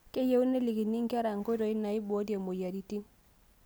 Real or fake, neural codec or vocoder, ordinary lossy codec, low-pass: real; none; none; none